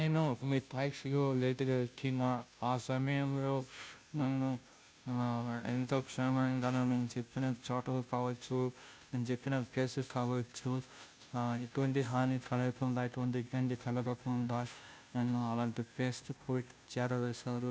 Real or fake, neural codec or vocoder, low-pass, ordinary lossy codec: fake; codec, 16 kHz, 0.5 kbps, FunCodec, trained on Chinese and English, 25 frames a second; none; none